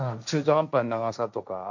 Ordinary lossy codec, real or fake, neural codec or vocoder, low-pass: none; fake; codec, 16 kHz, 1.1 kbps, Voila-Tokenizer; 7.2 kHz